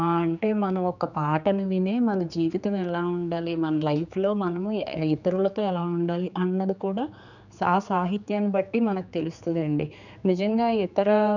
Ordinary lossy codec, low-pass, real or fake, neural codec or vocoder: none; 7.2 kHz; fake; codec, 16 kHz, 4 kbps, X-Codec, HuBERT features, trained on general audio